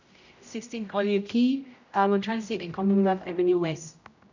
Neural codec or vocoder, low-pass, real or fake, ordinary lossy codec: codec, 16 kHz, 0.5 kbps, X-Codec, HuBERT features, trained on general audio; 7.2 kHz; fake; none